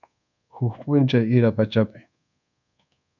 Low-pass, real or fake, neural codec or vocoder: 7.2 kHz; fake; codec, 24 kHz, 1.2 kbps, DualCodec